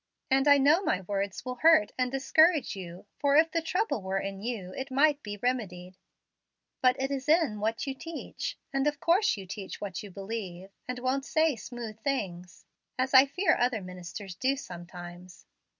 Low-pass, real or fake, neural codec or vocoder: 7.2 kHz; real; none